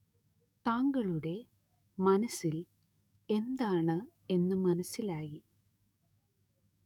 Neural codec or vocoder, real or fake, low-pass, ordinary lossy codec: codec, 44.1 kHz, 7.8 kbps, DAC; fake; 19.8 kHz; none